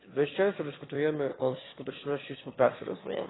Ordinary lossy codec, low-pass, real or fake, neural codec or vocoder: AAC, 16 kbps; 7.2 kHz; fake; autoencoder, 22.05 kHz, a latent of 192 numbers a frame, VITS, trained on one speaker